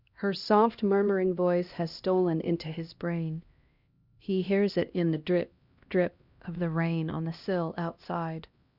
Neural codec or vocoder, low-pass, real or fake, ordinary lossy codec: codec, 16 kHz, 1 kbps, X-Codec, HuBERT features, trained on LibriSpeech; 5.4 kHz; fake; Opus, 64 kbps